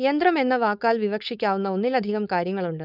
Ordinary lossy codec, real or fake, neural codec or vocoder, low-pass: none; fake; codec, 16 kHz, 4.8 kbps, FACodec; 5.4 kHz